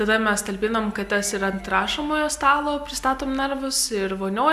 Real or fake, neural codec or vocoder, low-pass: real; none; 14.4 kHz